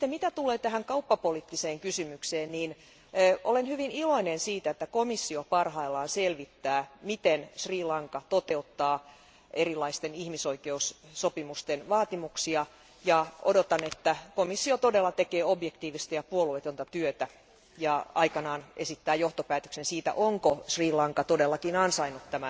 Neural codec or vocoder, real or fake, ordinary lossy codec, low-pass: none; real; none; none